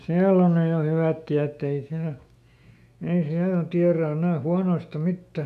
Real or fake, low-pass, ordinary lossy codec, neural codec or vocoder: fake; 14.4 kHz; none; autoencoder, 48 kHz, 128 numbers a frame, DAC-VAE, trained on Japanese speech